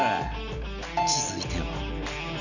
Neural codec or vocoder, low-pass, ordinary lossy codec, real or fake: none; 7.2 kHz; none; real